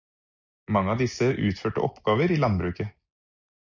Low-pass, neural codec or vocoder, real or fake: 7.2 kHz; none; real